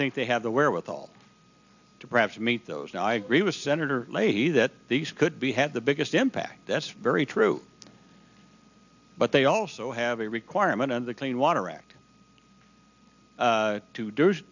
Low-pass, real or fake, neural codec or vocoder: 7.2 kHz; real; none